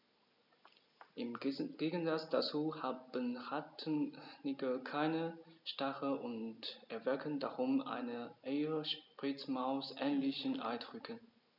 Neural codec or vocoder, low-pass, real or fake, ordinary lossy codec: none; 5.4 kHz; real; none